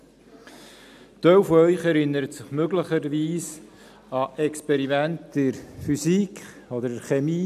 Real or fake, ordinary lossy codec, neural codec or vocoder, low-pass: real; none; none; 14.4 kHz